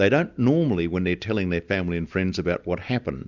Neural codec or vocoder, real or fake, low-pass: none; real; 7.2 kHz